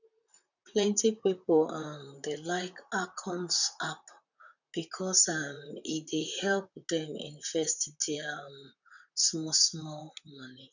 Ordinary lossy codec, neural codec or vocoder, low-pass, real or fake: none; vocoder, 44.1 kHz, 128 mel bands, Pupu-Vocoder; 7.2 kHz; fake